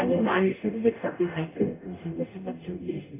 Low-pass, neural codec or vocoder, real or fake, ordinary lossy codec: 3.6 kHz; codec, 44.1 kHz, 0.9 kbps, DAC; fake; AAC, 16 kbps